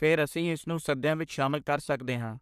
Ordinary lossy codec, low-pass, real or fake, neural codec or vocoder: none; 14.4 kHz; fake; codec, 44.1 kHz, 3.4 kbps, Pupu-Codec